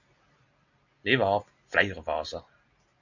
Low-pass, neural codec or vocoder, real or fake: 7.2 kHz; none; real